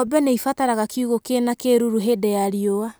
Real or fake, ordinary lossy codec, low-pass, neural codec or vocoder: real; none; none; none